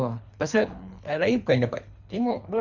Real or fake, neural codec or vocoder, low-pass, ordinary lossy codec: fake; codec, 24 kHz, 3 kbps, HILCodec; 7.2 kHz; none